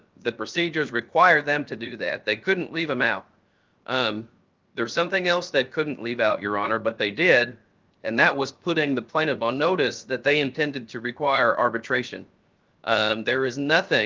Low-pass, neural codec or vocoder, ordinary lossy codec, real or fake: 7.2 kHz; codec, 16 kHz, 0.7 kbps, FocalCodec; Opus, 24 kbps; fake